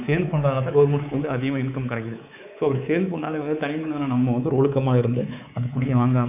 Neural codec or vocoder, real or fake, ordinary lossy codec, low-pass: codec, 24 kHz, 3.1 kbps, DualCodec; fake; none; 3.6 kHz